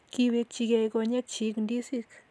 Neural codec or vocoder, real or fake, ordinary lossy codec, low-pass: none; real; none; none